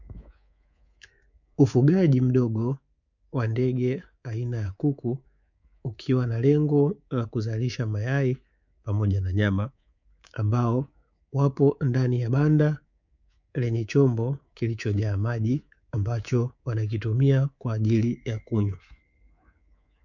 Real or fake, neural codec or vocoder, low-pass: fake; codec, 24 kHz, 3.1 kbps, DualCodec; 7.2 kHz